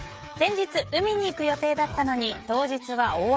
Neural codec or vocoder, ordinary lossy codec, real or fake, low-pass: codec, 16 kHz, 8 kbps, FreqCodec, smaller model; none; fake; none